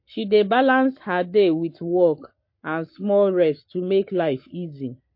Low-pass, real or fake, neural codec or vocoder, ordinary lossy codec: 5.4 kHz; fake; codec, 44.1 kHz, 7.8 kbps, Pupu-Codec; MP3, 32 kbps